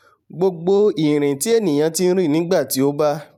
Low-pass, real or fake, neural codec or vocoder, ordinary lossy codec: 19.8 kHz; fake; vocoder, 44.1 kHz, 128 mel bands every 512 samples, BigVGAN v2; none